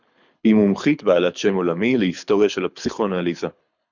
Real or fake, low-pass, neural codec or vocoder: fake; 7.2 kHz; codec, 24 kHz, 6 kbps, HILCodec